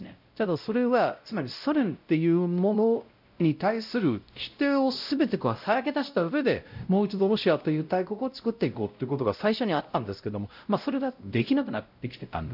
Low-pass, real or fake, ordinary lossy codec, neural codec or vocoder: 5.4 kHz; fake; none; codec, 16 kHz, 0.5 kbps, X-Codec, WavLM features, trained on Multilingual LibriSpeech